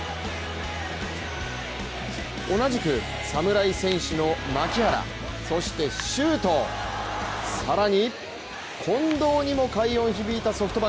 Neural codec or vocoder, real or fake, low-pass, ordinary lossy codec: none; real; none; none